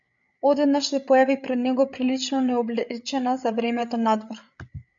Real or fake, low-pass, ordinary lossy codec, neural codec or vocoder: fake; 7.2 kHz; MP3, 64 kbps; codec, 16 kHz, 8 kbps, FreqCodec, larger model